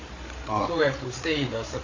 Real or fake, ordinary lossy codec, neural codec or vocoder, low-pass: fake; AAC, 32 kbps; codec, 16 kHz, 8 kbps, FreqCodec, larger model; 7.2 kHz